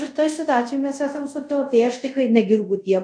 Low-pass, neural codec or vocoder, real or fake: 9.9 kHz; codec, 24 kHz, 0.5 kbps, DualCodec; fake